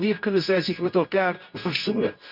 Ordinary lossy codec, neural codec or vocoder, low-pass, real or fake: none; codec, 24 kHz, 0.9 kbps, WavTokenizer, medium music audio release; 5.4 kHz; fake